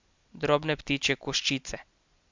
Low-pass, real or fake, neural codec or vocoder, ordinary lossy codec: 7.2 kHz; real; none; MP3, 64 kbps